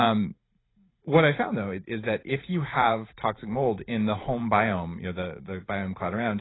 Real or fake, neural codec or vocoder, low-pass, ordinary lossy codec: fake; vocoder, 44.1 kHz, 128 mel bands every 512 samples, BigVGAN v2; 7.2 kHz; AAC, 16 kbps